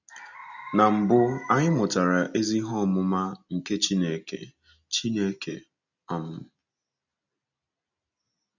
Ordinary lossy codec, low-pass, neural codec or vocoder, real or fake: none; 7.2 kHz; none; real